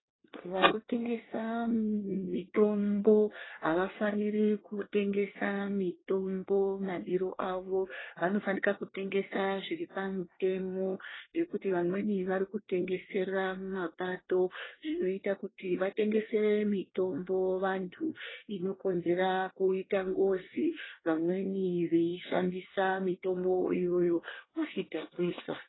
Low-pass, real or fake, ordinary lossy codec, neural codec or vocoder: 7.2 kHz; fake; AAC, 16 kbps; codec, 24 kHz, 1 kbps, SNAC